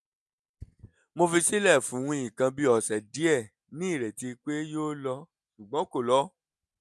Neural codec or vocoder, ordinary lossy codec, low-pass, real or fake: none; none; none; real